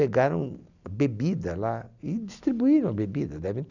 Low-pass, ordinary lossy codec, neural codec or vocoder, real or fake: 7.2 kHz; none; none; real